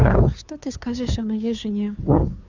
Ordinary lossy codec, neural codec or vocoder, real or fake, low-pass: none; codec, 16 kHz, 2 kbps, FunCodec, trained on Chinese and English, 25 frames a second; fake; 7.2 kHz